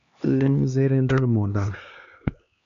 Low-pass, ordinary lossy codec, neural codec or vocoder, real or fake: 7.2 kHz; MP3, 96 kbps; codec, 16 kHz, 2 kbps, X-Codec, HuBERT features, trained on LibriSpeech; fake